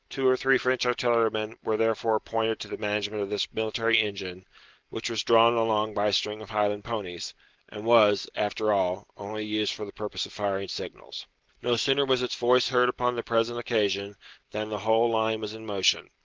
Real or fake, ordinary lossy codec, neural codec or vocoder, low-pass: real; Opus, 16 kbps; none; 7.2 kHz